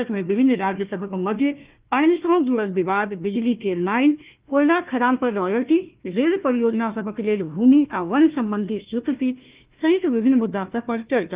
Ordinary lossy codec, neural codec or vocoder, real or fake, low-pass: Opus, 32 kbps; codec, 16 kHz, 1 kbps, FunCodec, trained on Chinese and English, 50 frames a second; fake; 3.6 kHz